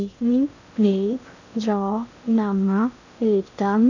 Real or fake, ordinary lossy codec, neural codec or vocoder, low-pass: fake; none; codec, 16 kHz in and 24 kHz out, 0.6 kbps, FocalCodec, streaming, 2048 codes; 7.2 kHz